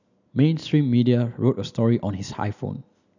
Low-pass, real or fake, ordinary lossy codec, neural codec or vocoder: 7.2 kHz; real; none; none